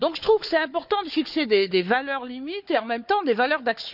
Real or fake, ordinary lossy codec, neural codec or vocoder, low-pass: fake; none; codec, 16 kHz, 4 kbps, FunCodec, trained on Chinese and English, 50 frames a second; 5.4 kHz